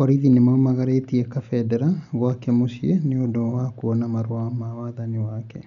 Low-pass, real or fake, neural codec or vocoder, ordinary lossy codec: 7.2 kHz; real; none; none